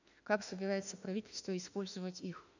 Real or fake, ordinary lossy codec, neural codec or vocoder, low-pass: fake; none; autoencoder, 48 kHz, 32 numbers a frame, DAC-VAE, trained on Japanese speech; 7.2 kHz